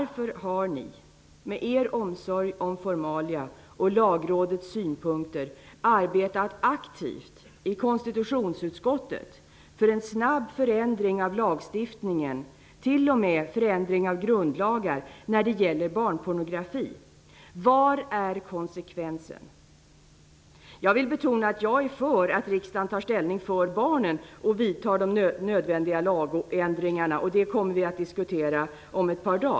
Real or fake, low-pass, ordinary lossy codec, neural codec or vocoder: real; none; none; none